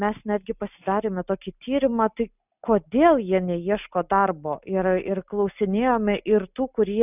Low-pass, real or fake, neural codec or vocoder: 3.6 kHz; real; none